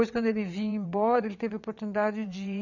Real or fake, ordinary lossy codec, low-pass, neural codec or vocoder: fake; none; 7.2 kHz; vocoder, 22.05 kHz, 80 mel bands, WaveNeXt